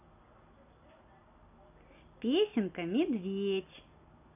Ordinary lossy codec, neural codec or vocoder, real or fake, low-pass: none; none; real; 3.6 kHz